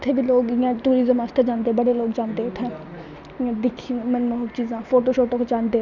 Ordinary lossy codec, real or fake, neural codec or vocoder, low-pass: none; real; none; 7.2 kHz